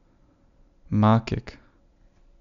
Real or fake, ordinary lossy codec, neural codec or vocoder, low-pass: real; none; none; 7.2 kHz